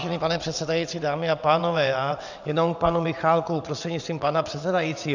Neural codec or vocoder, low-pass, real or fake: vocoder, 22.05 kHz, 80 mel bands, WaveNeXt; 7.2 kHz; fake